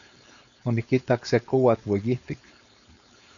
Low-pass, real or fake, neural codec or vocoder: 7.2 kHz; fake; codec, 16 kHz, 4.8 kbps, FACodec